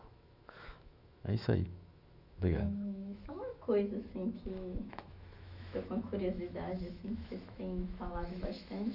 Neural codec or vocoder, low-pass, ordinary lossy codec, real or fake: autoencoder, 48 kHz, 128 numbers a frame, DAC-VAE, trained on Japanese speech; 5.4 kHz; none; fake